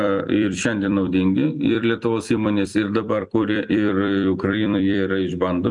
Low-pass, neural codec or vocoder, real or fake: 10.8 kHz; vocoder, 44.1 kHz, 128 mel bands every 256 samples, BigVGAN v2; fake